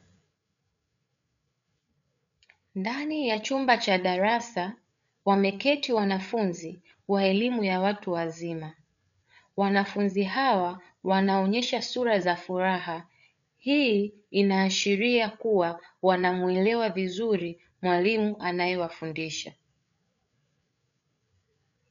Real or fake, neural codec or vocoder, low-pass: fake; codec, 16 kHz, 8 kbps, FreqCodec, larger model; 7.2 kHz